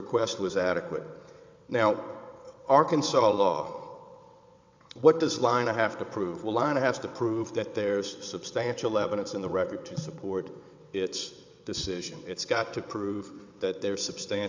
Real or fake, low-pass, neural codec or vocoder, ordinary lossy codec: fake; 7.2 kHz; vocoder, 22.05 kHz, 80 mel bands, WaveNeXt; MP3, 64 kbps